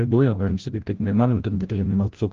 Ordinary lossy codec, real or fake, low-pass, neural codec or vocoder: Opus, 16 kbps; fake; 7.2 kHz; codec, 16 kHz, 0.5 kbps, FreqCodec, larger model